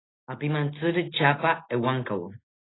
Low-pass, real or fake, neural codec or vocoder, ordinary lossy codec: 7.2 kHz; real; none; AAC, 16 kbps